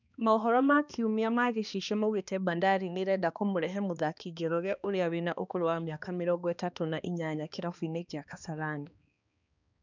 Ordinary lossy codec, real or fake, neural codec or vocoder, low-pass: none; fake; codec, 16 kHz, 2 kbps, X-Codec, HuBERT features, trained on balanced general audio; 7.2 kHz